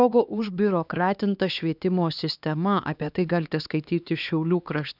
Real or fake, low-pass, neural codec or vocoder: fake; 5.4 kHz; codec, 16 kHz, 2 kbps, X-Codec, HuBERT features, trained on LibriSpeech